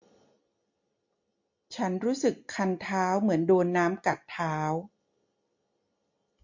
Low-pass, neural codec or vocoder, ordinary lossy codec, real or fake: 7.2 kHz; none; MP3, 48 kbps; real